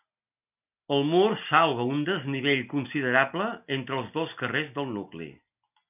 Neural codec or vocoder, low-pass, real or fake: none; 3.6 kHz; real